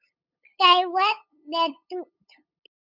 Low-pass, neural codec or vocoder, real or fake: 5.4 kHz; codec, 16 kHz, 8 kbps, FunCodec, trained on LibriTTS, 25 frames a second; fake